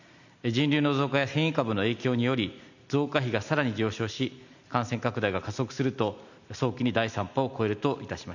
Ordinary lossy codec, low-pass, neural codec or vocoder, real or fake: none; 7.2 kHz; none; real